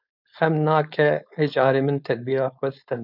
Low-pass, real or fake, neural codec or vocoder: 5.4 kHz; fake; codec, 16 kHz, 4.8 kbps, FACodec